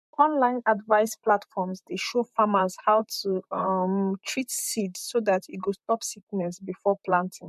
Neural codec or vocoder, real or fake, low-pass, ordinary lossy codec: vocoder, 44.1 kHz, 128 mel bands, Pupu-Vocoder; fake; 14.4 kHz; MP3, 64 kbps